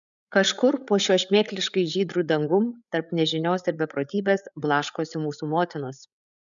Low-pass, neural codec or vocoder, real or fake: 7.2 kHz; codec, 16 kHz, 8 kbps, FreqCodec, larger model; fake